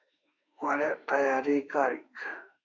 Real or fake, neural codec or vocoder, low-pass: fake; autoencoder, 48 kHz, 32 numbers a frame, DAC-VAE, trained on Japanese speech; 7.2 kHz